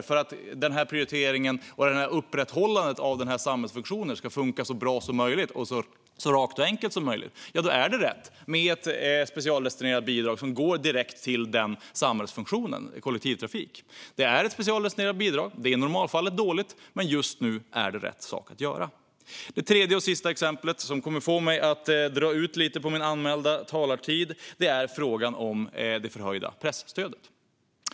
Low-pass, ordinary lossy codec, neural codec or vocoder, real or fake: none; none; none; real